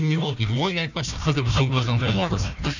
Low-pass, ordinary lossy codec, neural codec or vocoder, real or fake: 7.2 kHz; none; codec, 16 kHz, 1 kbps, FunCodec, trained on Chinese and English, 50 frames a second; fake